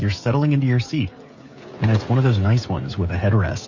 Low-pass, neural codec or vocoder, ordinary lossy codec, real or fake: 7.2 kHz; codec, 16 kHz, 16 kbps, FreqCodec, smaller model; MP3, 32 kbps; fake